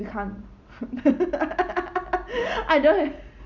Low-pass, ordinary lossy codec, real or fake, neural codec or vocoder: 7.2 kHz; none; real; none